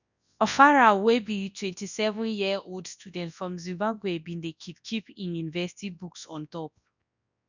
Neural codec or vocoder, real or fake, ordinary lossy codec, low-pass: codec, 24 kHz, 0.9 kbps, WavTokenizer, large speech release; fake; none; 7.2 kHz